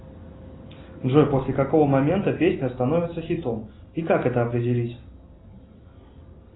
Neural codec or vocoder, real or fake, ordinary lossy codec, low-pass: none; real; AAC, 16 kbps; 7.2 kHz